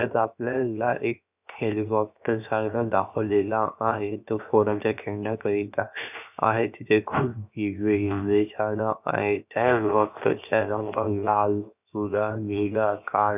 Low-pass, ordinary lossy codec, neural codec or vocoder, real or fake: 3.6 kHz; none; codec, 16 kHz, 0.7 kbps, FocalCodec; fake